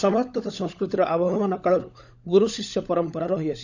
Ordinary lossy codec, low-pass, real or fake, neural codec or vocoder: none; 7.2 kHz; fake; codec, 16 kHz, 16 kbps, FunCodec, trained on LibriTTS, 50 frames a second